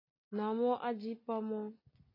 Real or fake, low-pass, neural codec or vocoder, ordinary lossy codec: real; 5.4 kHz; none; MP3, 24 kbps